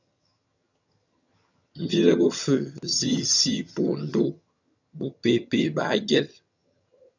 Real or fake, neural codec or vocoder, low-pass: fake; vocoder, 22.05 kHz, 80 mel bands, HiFi-GAN; 7.2 kHz